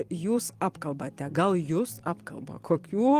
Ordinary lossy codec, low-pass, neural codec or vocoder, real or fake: Opus, 24 kbps; 14.4 kHz; vocoder, 44.1 kHz, 128 mel bands every 256 samples, BigVGAN v2; fake